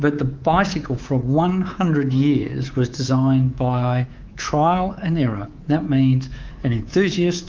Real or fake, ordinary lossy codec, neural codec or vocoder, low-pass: fake; Opus, 32 kbps; codec, 24 kHz, 3.1 kbps, DualCodec; 7.2 kHz